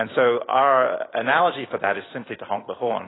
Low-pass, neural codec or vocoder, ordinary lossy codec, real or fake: 7.2 kHz; vocoder, 44.1 kHz, 128 mel bands every 256 samples, BigVGAN v2; AAC, 16 kbps; fake